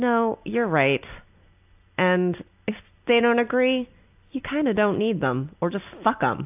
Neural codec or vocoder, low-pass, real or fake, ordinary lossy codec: none; 3.6 kHz; real; AAC, 32 kbps